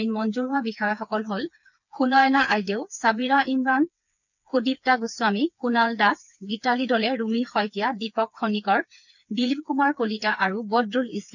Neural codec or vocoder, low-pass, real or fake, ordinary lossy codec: codec, 16 kHz, 4 kbps, FreqCodec, smaller model; 7.2 kHz; fake; none